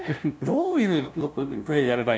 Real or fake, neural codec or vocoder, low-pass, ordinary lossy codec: fake; codec, 16 kHz, 0.5 kbps, FunCodec, trained on LibriTTS, 25 frames a second; none; none